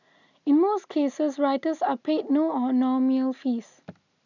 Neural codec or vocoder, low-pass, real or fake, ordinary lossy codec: none; 7.2 kHz; real; none